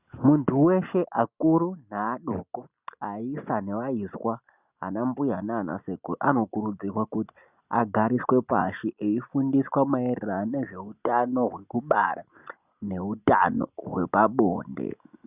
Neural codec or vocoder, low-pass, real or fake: none; 3.6 kHz; real